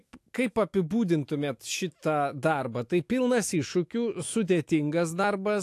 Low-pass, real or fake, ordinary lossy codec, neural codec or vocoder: 14.4 kHz; fake; AAC, 64 kbps; autoencoder, 48 kHz, 128 numbers a frame, DAC-VAE, trained on Japanese speech